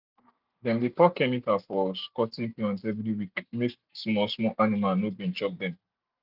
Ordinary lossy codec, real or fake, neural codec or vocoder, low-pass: none; real; none; 5.4 kHz